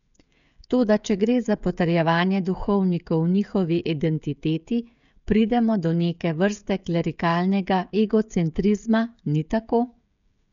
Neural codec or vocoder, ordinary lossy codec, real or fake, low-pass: codec, 16 kHz, 16 kbps, FreqCodec, smaller model; none; fake; 7.2 kHz